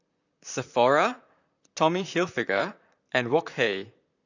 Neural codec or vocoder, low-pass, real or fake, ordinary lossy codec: vocoder, 44.1 kHz, 128 mel bands, Pupu-Vocoder; 7.2 kHz; fake; none